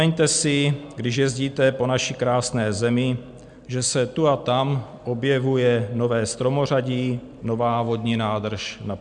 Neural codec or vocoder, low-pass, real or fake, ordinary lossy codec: none; 9.9 kHz; real; MP3, 96 kbps